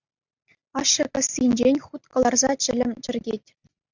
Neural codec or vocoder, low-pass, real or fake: none; 7.2 kHz; real